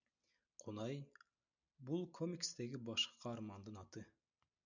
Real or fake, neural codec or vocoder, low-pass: real; none; 7.2 kHz